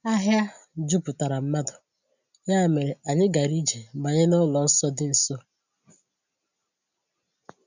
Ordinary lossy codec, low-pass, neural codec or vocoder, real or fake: none; 7.2 kHz; none; real